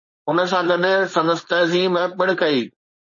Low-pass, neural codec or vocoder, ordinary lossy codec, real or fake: 7.2 kHz; codec, 16 kHz, 4.8 kbps, FACodec; MP3, 32 kbps; fake